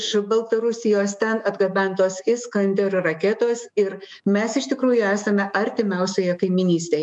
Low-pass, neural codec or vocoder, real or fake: 10.8 kHz; autoencoder, 48 kHz, 128 numbers a frame, DAC-VAE, trained on Japanese speech; fake